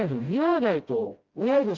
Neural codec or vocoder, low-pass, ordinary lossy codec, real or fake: codec, 16 kHz, 0.5 kbps, FreqCodec, smaller model; 7.2 kHz; Opus, 32 kbps; fake